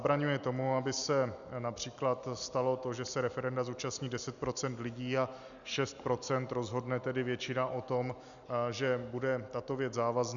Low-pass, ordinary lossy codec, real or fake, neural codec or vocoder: 7.2 kHz; MP3, 96 kbps; real; none